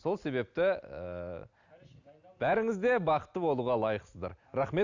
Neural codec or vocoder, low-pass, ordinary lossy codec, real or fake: none; 7.2 kHz; none; real